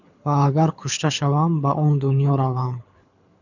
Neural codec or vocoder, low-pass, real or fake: codec, 24 kHz, 6 kbps, HILCodec; 7.2 kHz; fake